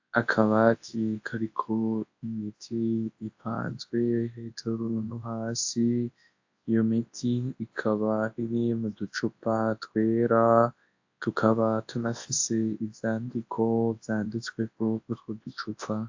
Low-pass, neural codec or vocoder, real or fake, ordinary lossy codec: 7.2 kHz; codec, 24 kHz, 0.9 kbps, WavTokenizer, large speech release; fake; AAC, 48 kbps